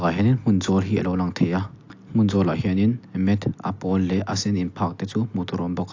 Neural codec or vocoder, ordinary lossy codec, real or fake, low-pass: none; AAC, 48 kbps; real; 7.2 kHz